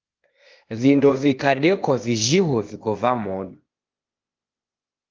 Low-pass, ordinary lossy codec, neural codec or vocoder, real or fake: 7.2 kHz; Opus, 32 kbps; codec, 16 kHz, 0.8 kbps, ZipCodec; fake